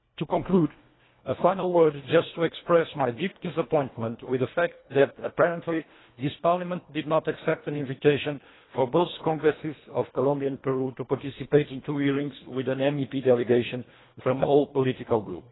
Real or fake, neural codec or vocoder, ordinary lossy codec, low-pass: fake; codec, 24 kHz, 1.5 kbps, HILCodec; AAC, 16 kbps; 7.2 kHz